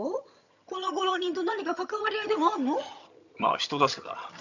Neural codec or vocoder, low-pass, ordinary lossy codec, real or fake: vocoder, 22.05 kHz, 80 mel bands, HiFi-GAN; 7.2 kHz; none; fake